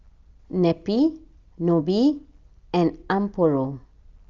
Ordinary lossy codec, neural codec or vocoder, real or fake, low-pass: Opus, 32 kbps; none; real; 7.2 kHz